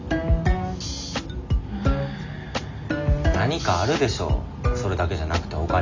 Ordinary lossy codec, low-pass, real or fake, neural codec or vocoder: MP3, 64 kbps; 7.2 kHz; real; none